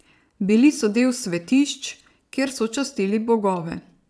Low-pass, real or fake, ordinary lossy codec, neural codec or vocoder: none; fake; none; vocoder, 22.05 kHz, 80 mel bands, Vocos